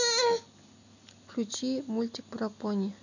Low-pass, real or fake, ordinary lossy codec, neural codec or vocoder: 7.2 kHz; real; none; none